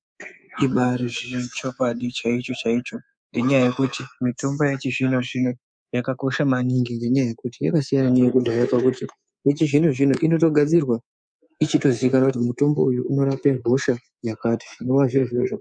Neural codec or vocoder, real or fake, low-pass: codec, 24 kHz, 3.1 kbps, DualCodec; fake; 9.9 kHz